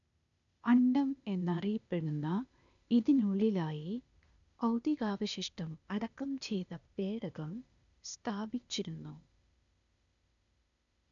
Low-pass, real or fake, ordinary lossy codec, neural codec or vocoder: 7.2 kHz; fake; none; codec, 16 kHz, 0.8 kbps, ZipCodec